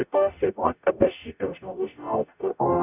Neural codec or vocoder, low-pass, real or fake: codec, 44.1 kHz, 0.9 kbps, DAC; 3.6 kHz; fake